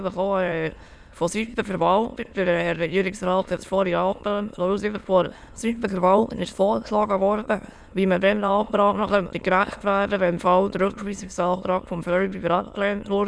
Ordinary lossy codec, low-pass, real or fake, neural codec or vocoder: none; none; fake; autoencoder, 22.05 kHz, a latent of 192 numbers a frame, VITS, trained on many speakers